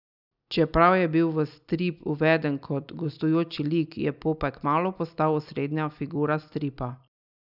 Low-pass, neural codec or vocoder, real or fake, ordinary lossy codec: 5.4 kHz; none; real; none